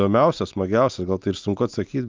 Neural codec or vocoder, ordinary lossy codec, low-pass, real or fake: none; Opus, 24 kbps; 7.2 kHz; real